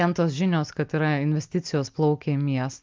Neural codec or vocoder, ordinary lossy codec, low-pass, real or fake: none; Opus, 32 kbps; 7.2 kHz; real